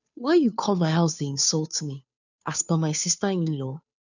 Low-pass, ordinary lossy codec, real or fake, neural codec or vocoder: 7.2 kHz; none; fake; codec, 16 kHz, 2 kbps, FunCodec, trained on Chinese and English, 25 frames a second